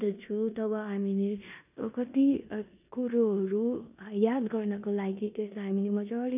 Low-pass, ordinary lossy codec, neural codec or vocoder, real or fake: 3.6 kHz; none; codec, 16 kHz in and 24 kHz out, 0.9 kbps, LongCat-Audio-Codec, four codebook decoder; fake